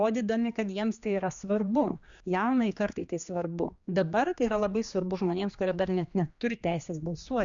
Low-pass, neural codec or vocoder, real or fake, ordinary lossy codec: 7.2 kHz; codec, 16 kHz, 2 kbps, X-Codec, HuBERT features, trained on general audio; fake; Opus, 64 kbps